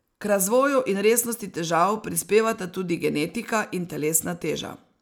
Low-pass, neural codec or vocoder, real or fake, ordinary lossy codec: none; none; real; none